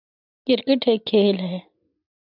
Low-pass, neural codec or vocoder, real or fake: 5.4 kHz; none; real